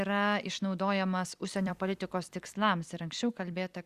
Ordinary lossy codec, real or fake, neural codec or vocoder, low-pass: Opus, 64 kbps; fake; vocoder, 44.1 kHz, 128 mel bands every 256 samples, BigVGAN v2; 14.4 kHz